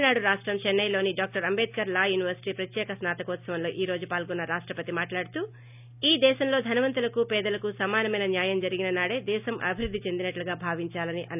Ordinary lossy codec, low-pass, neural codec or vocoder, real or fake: none; 3.6 kHz; none; real